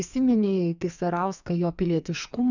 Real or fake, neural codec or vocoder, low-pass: fake; codec, 44.1 kHz, 2.6 kbps, SNAC; 7.2 kHz